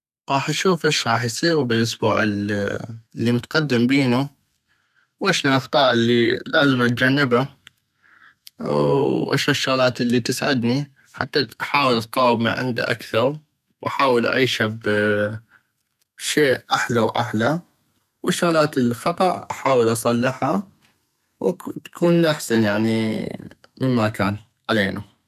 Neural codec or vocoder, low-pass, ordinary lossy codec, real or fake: codec, 32 kHz, 1.9 kbps, SNAC; 14.4 kHz; none; fake